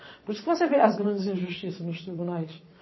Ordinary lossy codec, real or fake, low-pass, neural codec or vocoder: MP3, 24 kbps; fake; 7.2 kHz; vocoder, 22.05 kHz, 80 mel bands, WaveNeXt